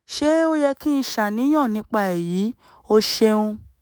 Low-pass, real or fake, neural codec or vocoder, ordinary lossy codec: none; fake; autoencoder, 48 kHz, 128 numbers a frame, DAC-VAE, trained on Japanese speech; none